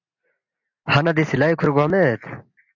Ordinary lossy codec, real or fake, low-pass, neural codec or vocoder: AAC, 48 kbps; real; 7.2 kHz; none